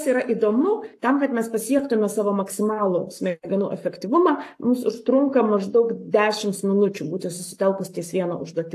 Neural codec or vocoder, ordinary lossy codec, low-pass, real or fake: codec, 44.1 kHz, 7.8 kbps, Pupu-Codec; AAC, 64 kbps; 14.4 kHz; fake